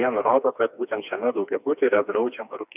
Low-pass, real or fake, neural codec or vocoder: 3.6 kHz; fake; codec, 16 kHz, 2 kbps, FreqCodec, smaller model